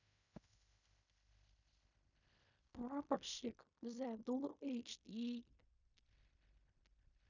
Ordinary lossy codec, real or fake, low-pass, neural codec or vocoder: none; fake; 7.2 kHz; codec, 16 kHz in and 24 kHz out, 0.4 kbps, LongCat-Audio-Codec, fine tuned four codebook decoder